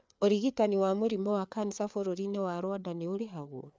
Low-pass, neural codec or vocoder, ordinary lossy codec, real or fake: none; codec, 16 kHz, 4 kbps, FreqCodec, larger model; none; fake